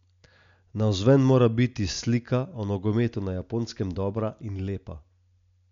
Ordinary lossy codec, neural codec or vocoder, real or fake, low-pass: MP3, 64 kbps; none; real; 7.2 kHz